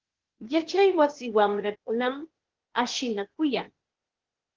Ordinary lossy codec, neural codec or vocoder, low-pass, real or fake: Opus, 16 kbps; codec, 16 kHz, 0.8 kbps, ZipCodec; 7.2 kHz; fake